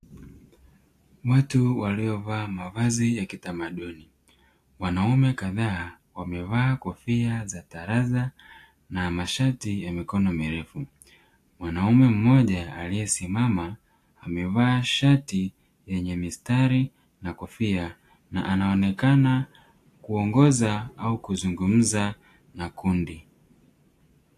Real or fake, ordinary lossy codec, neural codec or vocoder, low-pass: real; AAC, 64 kbps; none; 14.4 kHz